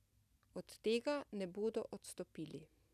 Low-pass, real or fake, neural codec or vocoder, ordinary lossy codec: 14.4 kHz; real; none; none